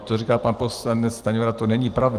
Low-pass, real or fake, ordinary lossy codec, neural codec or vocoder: 14.4 kHz; fake; Opus, 24 kbps; vocoder, 44.1 kHz, 128 mel bands every 512 samples, BigVGAN v2